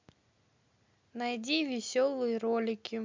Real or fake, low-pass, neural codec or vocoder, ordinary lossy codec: real; 7.2 kHz; none; MP3, 64 kbps